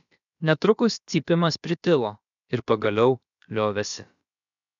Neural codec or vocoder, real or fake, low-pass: codec, 16 kHz, about 1 kbps, DyCAST, with the encoder's durations; fake; 7.2 kHz